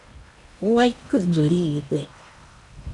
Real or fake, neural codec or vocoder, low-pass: fake; codec, 16 kHz in and 24 kHz out, 0.8 kbps, FocalCodec, streaming, 65536 codes; 10.8 kHz